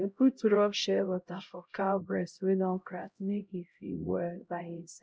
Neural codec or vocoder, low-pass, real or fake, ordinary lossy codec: codec, 16 kHz, 0.5 kbps, X-Codec, HuBERT features, trained on LibriSpeech; none; fake; none